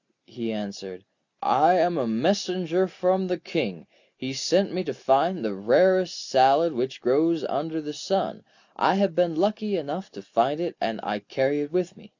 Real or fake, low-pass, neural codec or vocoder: real; 7.2 kHz; none